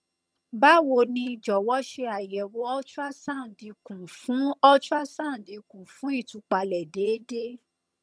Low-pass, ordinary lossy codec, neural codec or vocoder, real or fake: none; none; vocoder, 22.05 kHz, 80 mel bands, HiFi-GAN; fake